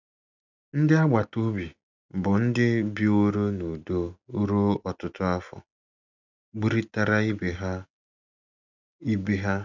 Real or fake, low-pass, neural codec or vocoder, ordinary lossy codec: real; 7.2 kHz; none; none